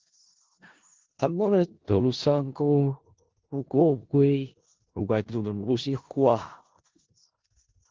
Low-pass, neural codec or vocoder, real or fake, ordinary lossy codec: 7.2 kHz; codec, 16 kHz in and 24 kHz out, 0.4 kbps, LongCat-Audio-Codec, four codebook decoder; fake; Opus, 16 kbps